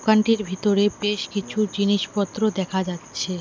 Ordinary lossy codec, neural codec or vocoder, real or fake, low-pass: none; none; real; none